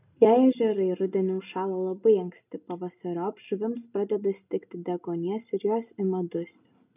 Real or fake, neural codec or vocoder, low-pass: real; none; 3.6 kHz